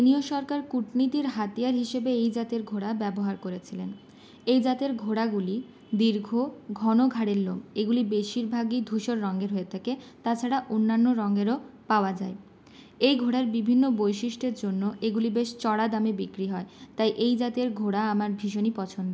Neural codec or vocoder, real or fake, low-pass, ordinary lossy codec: none; real; none; none